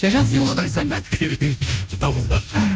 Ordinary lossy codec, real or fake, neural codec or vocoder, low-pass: none; fake; codec, 16 kHz, 0.5 kbps, FunCodec, trained on Chinese and English, 25 frames a second; none